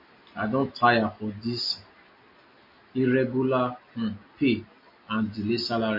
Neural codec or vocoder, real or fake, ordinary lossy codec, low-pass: none; real; MP3, 32 kbps; 5.4 kHz